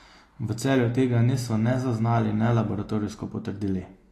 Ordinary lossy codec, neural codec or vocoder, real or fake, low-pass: AAC, 48 kbps; none; real; 14.4 kHz